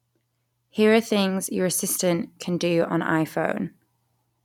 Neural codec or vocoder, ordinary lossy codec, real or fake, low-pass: none; none; real; 19.8 kHz